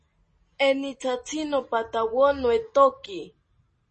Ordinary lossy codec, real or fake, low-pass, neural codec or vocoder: MP3, 32 kbps; real; 10.8 kHz; none